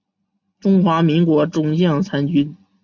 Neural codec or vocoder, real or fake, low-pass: none; real; 7.2 kHz